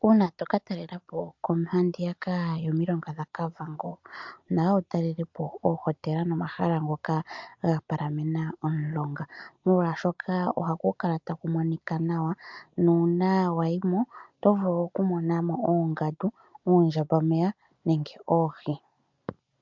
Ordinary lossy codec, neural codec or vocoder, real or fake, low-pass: MP3, 64 kbps; none; real; 7.2 kHz